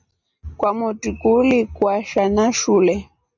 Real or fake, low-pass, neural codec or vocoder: real; 7.2 kHz; none